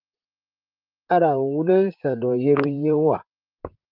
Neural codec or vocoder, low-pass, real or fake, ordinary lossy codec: vocoder, 44.1 kHz, 128 mel bands, Pupu-Vocoder; 5.4 kHz; fake; Opus, 24 kbps